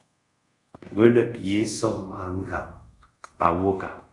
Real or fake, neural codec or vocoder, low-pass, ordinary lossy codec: fake; codec, 24 kHz, 0.5 kbps, DualCodec; 10.8 kHz; Opus, 64 kbps